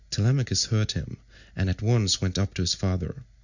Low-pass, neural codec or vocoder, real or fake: 7.2 kHz; none; real